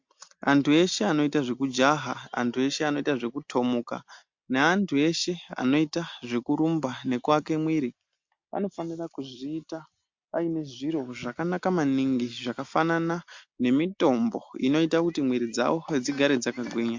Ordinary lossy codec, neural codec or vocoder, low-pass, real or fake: MP3, 48 kbps; none; 7.2 kHz; real